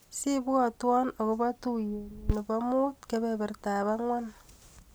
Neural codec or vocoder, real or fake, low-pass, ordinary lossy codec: none; real; none; none